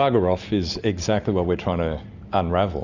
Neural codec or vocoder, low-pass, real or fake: none; 7.2 kHz; real